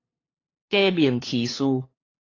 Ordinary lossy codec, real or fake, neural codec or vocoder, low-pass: AAC, 32 kbps; fake; codec, 16 kHz, 2 kbps, FunCodec, trained on LibriTTS, 25 frames a second; 7.2 kHz